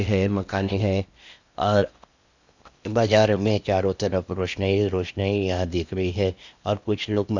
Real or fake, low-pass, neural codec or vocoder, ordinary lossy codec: fake; 7.2 kHz; codec, 16 kHz in and 24 kHz out, 0.8 kbps, FocalCodec, streaming, 65536 codes; Opus, 64 kbps